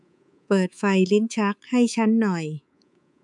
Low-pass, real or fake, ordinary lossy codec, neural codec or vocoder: 10.8 kHz; fake; none; codec, 24 kHz, 3.1 kbps, DualCodec